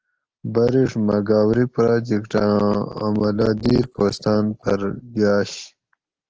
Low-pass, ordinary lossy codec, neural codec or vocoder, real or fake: 7.2 kHz; Opus, 16 kbps; none; real